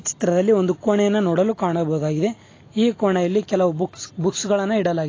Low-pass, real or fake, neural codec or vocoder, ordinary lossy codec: 7.2 kHz; real; none; AAC, 32 kbps